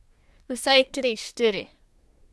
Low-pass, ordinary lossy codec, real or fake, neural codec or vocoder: none; none; fake; codec, 24 kHz, 1 kbps, SNAC